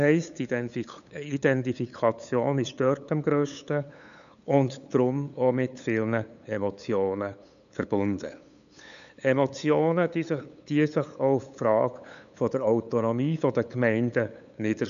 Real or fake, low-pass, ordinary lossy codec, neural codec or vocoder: fake; 7.2 kHz; none; codec, 16 kHz, 8 kbps, FunCodec, trained on LibriTTS, 25 frames a second